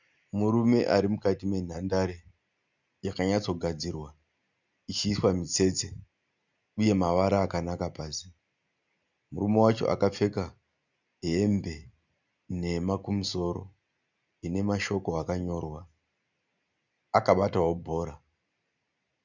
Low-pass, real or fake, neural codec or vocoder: 7.2 kHz; real; none